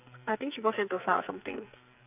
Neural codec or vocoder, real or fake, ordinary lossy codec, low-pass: codec, 44.1 kHz, 2.6 kbps, SNAC; fake; none; 3.6 kHz